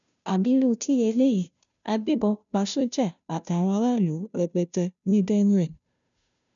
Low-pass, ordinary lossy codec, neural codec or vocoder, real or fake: 7.2 kHz; none; codec, 16 kHz, 0.5 kbps, FunCodec, trained on Chinese and English, 25 frames a second; fake